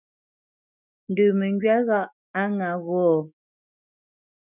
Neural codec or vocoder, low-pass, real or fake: autoencoder, 48 kHz, 128 numbers a frame, DAC-VAE, trained on Japanese speech; 3.6 kHz; fake